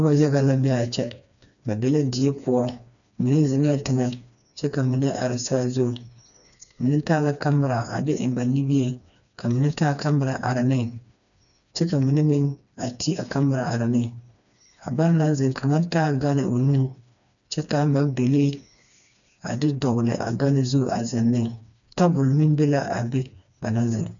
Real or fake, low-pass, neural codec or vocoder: fake; 7.2 kHz; codec, 16 kHz, 2 kbps, FreqCodec, smaller model